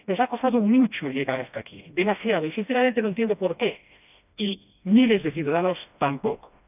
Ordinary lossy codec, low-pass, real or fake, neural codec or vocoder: none; 3.6 kHz; fake; codec, 16 kHz, 1 kbps, FreqCodec, smaller model